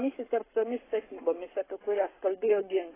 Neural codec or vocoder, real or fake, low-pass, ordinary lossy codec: codec, 44.1 kHz, 7.8 kbps, Pupu-Codec; fake; 3.6 kHz; AAC, 16 kbps